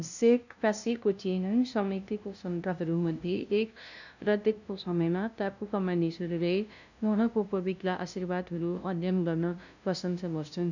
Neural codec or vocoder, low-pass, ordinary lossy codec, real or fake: codec, 16 kHz, 0.5 kbps, FunCodec, trained on LibriTTS, 25 frames a second; 7.2 kHz; none; fake